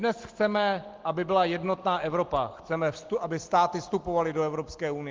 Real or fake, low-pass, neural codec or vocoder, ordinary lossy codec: real; 7.2 kHz; none; Opus, 16 kbps